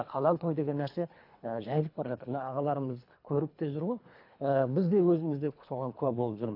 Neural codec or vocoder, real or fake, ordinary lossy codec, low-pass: codec, 24 kHz, 3 kbps, HILCodec; fake; AAC, 32 kbps; 5.4 kHz